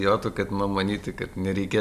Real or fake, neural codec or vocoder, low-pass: real; none; 14.4 kHz